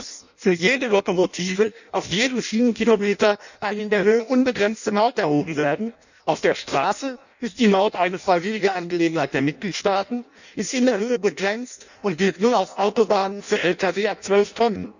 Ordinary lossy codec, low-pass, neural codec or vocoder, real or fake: none; 7.2 kHz; codec, 16 kHz in and 24 kHz out, 0.6 kbps, FireRedTTS-2 codec; fake